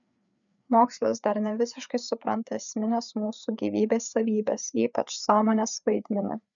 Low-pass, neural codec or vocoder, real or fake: 7.2 kHz; codec, 16 kHz, 4 kbps, FreqCodec, larger model; fake